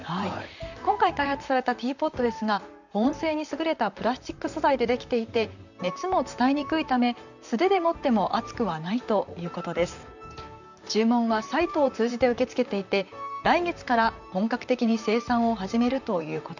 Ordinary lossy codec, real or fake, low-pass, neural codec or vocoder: none; fake; 7.2 kHz; vocoder, 44.1 kHz, 128 mel bands, Pupu-Vocoder